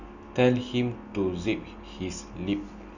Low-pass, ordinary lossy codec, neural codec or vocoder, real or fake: 7.2 kHz; none; none; real